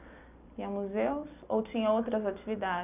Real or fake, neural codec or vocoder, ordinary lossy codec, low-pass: real; none; none; 3.6 kHz